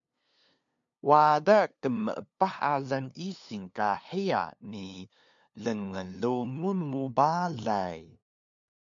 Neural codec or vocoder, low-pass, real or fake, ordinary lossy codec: codec, 16 kHz, 2 kbps, FunCodec, trained on LibriTTS, 25 frames a second; 7.2 kHz; fake; MP3, 64 kbps